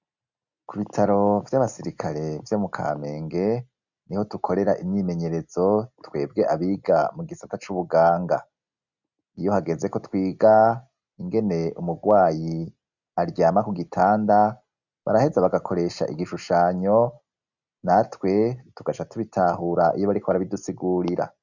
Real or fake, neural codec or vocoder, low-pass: real; none; 7.2 kHz